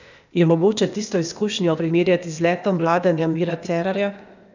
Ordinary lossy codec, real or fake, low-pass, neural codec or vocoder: none; fake; 7.2 kHz; codec, 16 kHz, 0.8 kbps, ZipCodec